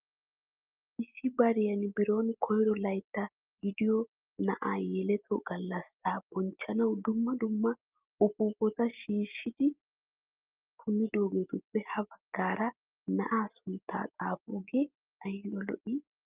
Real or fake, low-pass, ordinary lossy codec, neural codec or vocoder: real; 3.6 kHz; Opus, 24 kbps; none